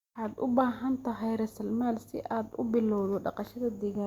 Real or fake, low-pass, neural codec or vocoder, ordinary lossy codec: real; 19.8 kHz; none; Opus, 64 kbps